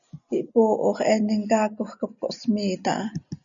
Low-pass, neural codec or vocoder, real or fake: 7.2 kHz; none; real